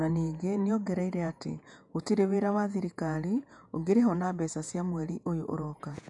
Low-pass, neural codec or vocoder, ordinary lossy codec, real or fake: 10.8 kHz; vocoder, 48 kHz, 128 mel bands, Vocos; none; fake